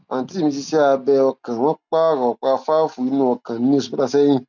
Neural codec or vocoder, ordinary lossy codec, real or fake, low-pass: none; none; real; 7.2 kHz